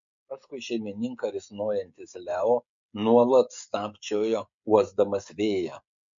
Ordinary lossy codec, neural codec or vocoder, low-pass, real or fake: MP3, 48 kbps; codec, 16 kHz, 16 kbps, FreqCodec, smaller model; 7.2 kHz; fake